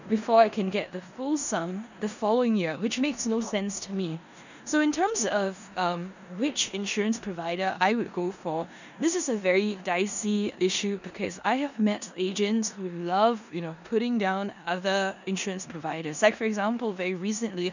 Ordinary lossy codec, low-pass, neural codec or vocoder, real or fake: none; 7.2 kHz; codec, 16 kHz in and 24 kHz out, 0.9 kbps, LongCat-Audio-Codec, four codebook decoder; fake